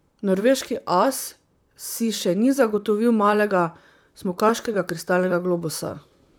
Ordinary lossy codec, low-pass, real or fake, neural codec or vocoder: none; none; fake; vocoder, 44.1 kHz, 128 mel bands, Pupu-Vocoder